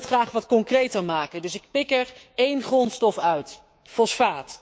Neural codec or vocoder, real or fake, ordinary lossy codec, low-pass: codec, 16 kHz, 6 kbps, DAC; fake; none; none